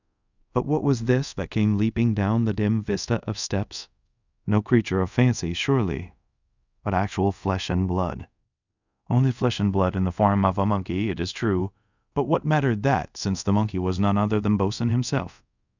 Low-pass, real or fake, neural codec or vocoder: 7.2 kHz; fake; codec, 24 kHz, 0.5 kbps, DualCodec